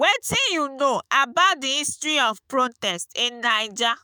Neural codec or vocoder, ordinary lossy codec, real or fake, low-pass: autoencoder, 48 kHz, 128 numbers a frame, DAC-VAE, trained on Japanese speech; none; fake; none